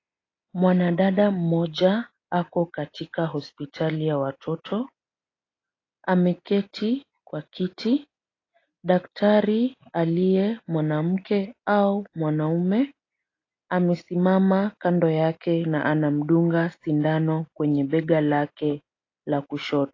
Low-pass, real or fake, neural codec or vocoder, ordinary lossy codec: 7.2 kHz; real; none; AAC, 32 kbps